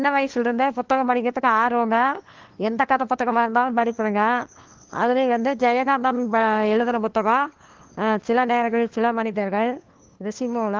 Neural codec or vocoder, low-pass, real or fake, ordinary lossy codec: codec, 16 kHz, 2 kbps, FunCodec, trained on LibriTTS, 25 frames a second; 7.2 kHz; fake; Opus, 16 kbps